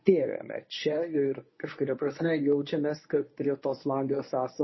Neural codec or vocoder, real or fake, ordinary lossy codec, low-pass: codec, 24 kHz, 0.9 kbps, WavTokenizer, medium speech release version 1; fake; MP3, 24 kbps; 7.2 kHz